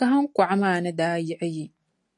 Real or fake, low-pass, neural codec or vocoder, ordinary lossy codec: real; 10.8 kHz; none; AAC, 64 kbps